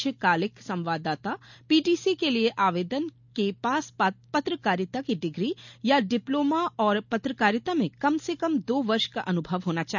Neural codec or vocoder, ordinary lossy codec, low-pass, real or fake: none; none; 7.2 kHz; real